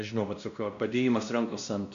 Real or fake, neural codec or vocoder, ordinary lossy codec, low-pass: fake; codec, 16 kHz, 1 kbps, X-Codec, WavLM features, trained on Multilingual LibriSpeech; MP3, 96 kbps; 7.2 kHz